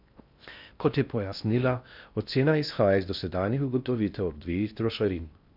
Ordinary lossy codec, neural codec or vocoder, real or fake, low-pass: none; codec, 16 kHz in and 24 kHz out, 0.6 kbps, FocalCodec, streaming, 2048 codes; fake; 5.4 kHz